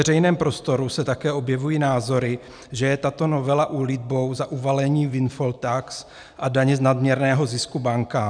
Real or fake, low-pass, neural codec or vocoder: real; 9.9 kHz; none